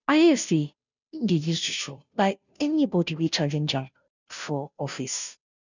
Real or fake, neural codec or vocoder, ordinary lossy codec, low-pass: fake; codec, 16 kHz, 0.5 kbps, FunCodec, trained on Chinese and English, 25 frames a second; none; 7.2 kHz